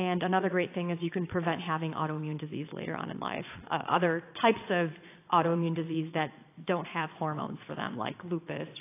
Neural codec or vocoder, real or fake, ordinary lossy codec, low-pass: vocoder, 22.05 kHz, 80 mel bands, Vocos; fake; AAC, 24 kbps; 3.6 kHz